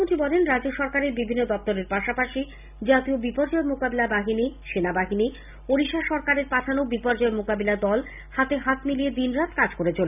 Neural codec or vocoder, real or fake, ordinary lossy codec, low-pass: none; real; none; 3.6 kHz